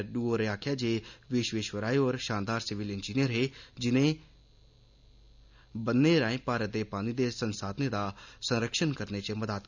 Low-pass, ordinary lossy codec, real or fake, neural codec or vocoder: 7.2 kHz; none; real; none